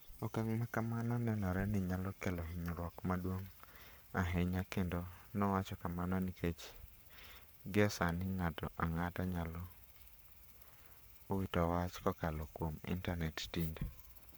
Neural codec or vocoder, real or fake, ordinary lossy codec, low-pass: codec, 44.1 kHz, 7.8 kbps, Pupu-Codec; fake; none; none